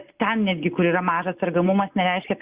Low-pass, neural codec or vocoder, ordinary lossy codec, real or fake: 3.6 kHz; none; Opus, 64 kbps; real